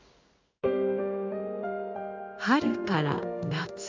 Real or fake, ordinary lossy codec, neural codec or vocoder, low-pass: fake; MP3, 64 kbps; codec, 16 kHz in and 24 kHz out, 1 kbps, XY-Tokenizer; 7.2 kHz